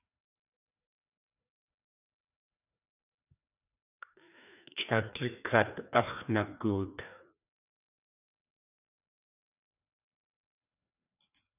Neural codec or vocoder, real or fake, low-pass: codec, 16 kHz, 2 kbps, FreqCodec, larger model; fake; 3.6 kHz